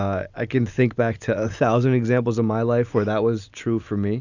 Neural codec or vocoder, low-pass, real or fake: none; 7.2 kHz; real